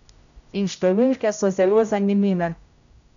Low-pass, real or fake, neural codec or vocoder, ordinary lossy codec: 7.2 kHz; fake; codec, 16 kHz, 0.5 kbps, X-Codec, HuBERT features, trained on general audio; none